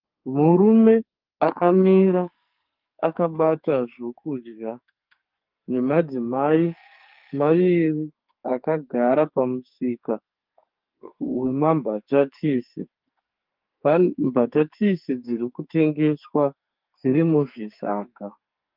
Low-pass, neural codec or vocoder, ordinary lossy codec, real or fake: 5.4 kHz; codec, 44.1 kHz, 2.6 kbps, SNAC; Opus, 24 kbps; fake